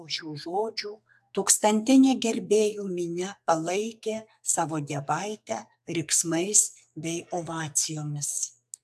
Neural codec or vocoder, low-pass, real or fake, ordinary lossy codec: codec, 44.1 kHz, 2.6 kbps, SNAC; 14.4 kHz; fake; AAC, 96 kbps